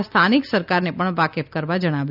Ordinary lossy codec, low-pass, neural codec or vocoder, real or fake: none; 5.4 kHz; none; real